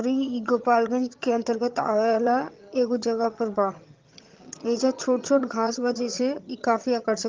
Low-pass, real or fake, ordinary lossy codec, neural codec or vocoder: 7.2 kHz; fake; Opus, 24 kbps; vocoder, 22.05 kHz, 80 mel bands, HiFi-GAN